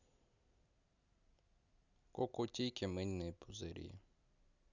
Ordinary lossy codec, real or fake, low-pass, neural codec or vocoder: none; real; 7.2 kHz; none